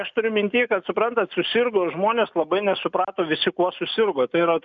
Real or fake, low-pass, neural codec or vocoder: real; 7.2 kHz; none